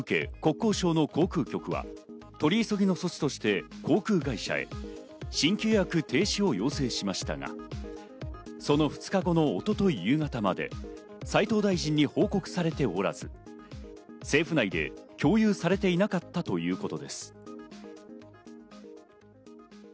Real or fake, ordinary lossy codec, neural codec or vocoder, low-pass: real; none; none; none